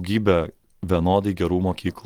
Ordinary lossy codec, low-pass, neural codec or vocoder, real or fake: Opus, 24 kbps; 19.8 kHz; none; real